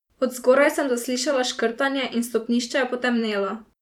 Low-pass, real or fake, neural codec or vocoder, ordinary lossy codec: 19.8 kHz; fake; vocoder, 44.1 kHz, 128 mel bands every 512 samples, BigVGAN v2; none